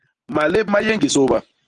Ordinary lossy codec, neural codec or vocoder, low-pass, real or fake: Opus, 24 kbps; none; 10.8 kHz; real